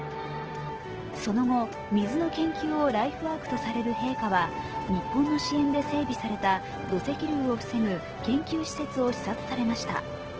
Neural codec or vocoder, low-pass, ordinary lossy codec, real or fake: none; 7.2 kHz; Opus, 16 kbps; real